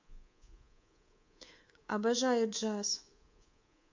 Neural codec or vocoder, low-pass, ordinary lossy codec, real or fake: codec, 24 kHz, 3.1 kbps, DualCodec; 7.2 kHz; MP3, 48 kbps; fake